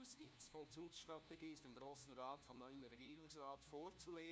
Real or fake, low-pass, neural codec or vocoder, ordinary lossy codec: fake; none; codec, 16 kHz, 1 kbps, FunCodec, trained on LibriTTS, 50 frames a second; none